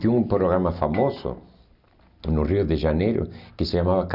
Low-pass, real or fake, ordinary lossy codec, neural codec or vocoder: 5.4 kHz; real; none; none